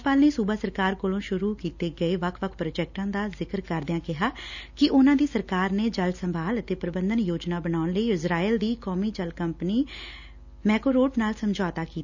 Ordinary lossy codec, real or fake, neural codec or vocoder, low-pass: none; real; none; 7.2 kHz